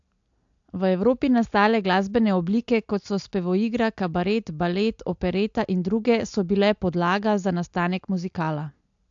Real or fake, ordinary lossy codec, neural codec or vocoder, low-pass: real; AAC, 64 kbps; none; 7.2 kHz